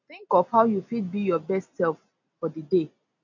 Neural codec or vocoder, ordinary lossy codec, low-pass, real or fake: none; none; 7.2 kHz; real